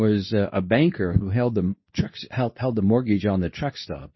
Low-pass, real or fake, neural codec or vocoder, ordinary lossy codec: 7.2 kHz; fake; codec, 24 kHz, 0.9 kbps, WavTokenizer, medium speech release version 2; MP3, 24 kbps